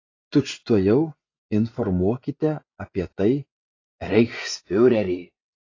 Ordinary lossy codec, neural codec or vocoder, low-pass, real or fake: AAC, 32 kbps; vocoder, 24 kHz, 100 mel bands, Vocos; 7.2 kHz; fake